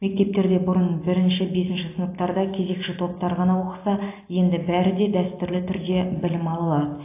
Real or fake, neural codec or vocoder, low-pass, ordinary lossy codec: real; none; 3.6 kHz; AAC, 24 kbps